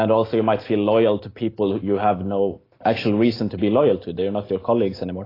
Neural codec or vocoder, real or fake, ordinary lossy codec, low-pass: none; real; AAC, 24 kbps; 5.4 kHz